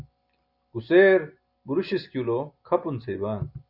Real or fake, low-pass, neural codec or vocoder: real; 5.4 kHz; none